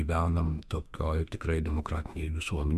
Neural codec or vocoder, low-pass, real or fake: autoencoder, 48 kHz, 32 numbers a frame, DAC-VAE, trained on Japanese speech; 14.4 kHz; fake